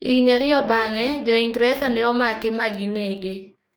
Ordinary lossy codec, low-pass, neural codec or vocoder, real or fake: none; none; codec, 44.1 kHz, 2.6 kbps, DAC; fake